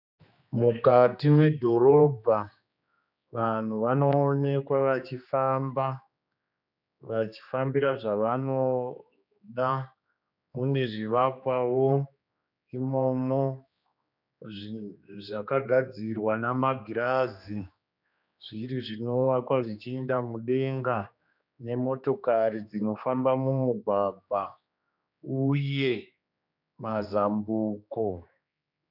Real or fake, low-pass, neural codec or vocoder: fake; 5.4 kHz; codec, 16 kHz, 2 kbps, X-Codec, HuBERT features, trained on general audio